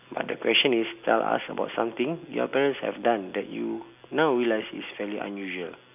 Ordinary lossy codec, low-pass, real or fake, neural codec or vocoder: none; 3.6 kHz; real; none